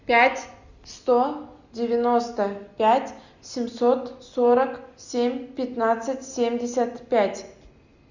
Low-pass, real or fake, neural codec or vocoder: 7.2 kHz; real; none